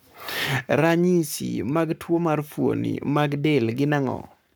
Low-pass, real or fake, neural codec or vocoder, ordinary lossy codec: none; fake; codec, 44.1 kHz, 7.8 kbps, Pupu-Codec; none